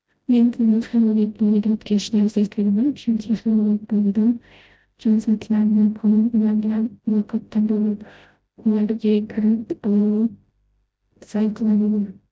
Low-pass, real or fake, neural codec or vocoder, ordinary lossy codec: none; fake; codec, 16 kHz, 0.5 kbps, FreqCodec, smaller model; none